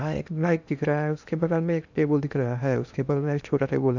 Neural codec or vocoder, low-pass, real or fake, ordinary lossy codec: codec, 16 kHz in and 24 kHz out, 0.8 kbps, FocalCodec, streaming, 65536 codes; 7.2 kHz; fake; none